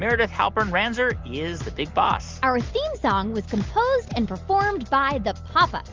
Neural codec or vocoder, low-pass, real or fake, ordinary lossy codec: none; 7.2 kHz; real; Opus, 24 kbps